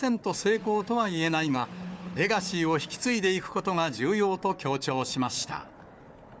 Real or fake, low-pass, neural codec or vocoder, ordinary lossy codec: fake; none; codec, 16 kHz, 4 kbps, FunCodec, trained on Chinese and English, 50 frames a second; none